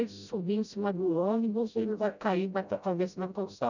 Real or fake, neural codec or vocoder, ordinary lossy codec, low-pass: fake; codec, 16 kHz, 0.5 kbps, FreqCodec, smaller model; none; 7.2 kHz